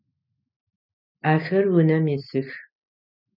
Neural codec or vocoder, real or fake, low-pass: none; real; 5.4 kHz